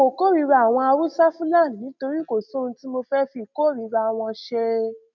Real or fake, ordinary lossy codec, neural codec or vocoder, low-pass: real; none; none; 7.2 kHz